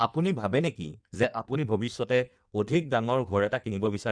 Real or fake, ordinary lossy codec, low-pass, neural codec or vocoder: fake; none; 9.9 kHz; codec, 16 kHz in and 24 kHz out, 1.1 kbps, FireRedTTS-2 codec